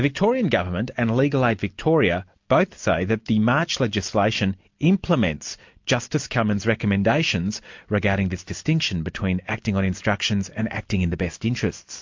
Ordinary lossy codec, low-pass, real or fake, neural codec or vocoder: MP3, 48 kbps; 7.2 kHz; real; none